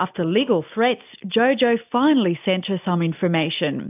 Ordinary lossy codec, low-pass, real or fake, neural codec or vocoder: AAC, 32 kbps; 3.6 kHz; real; none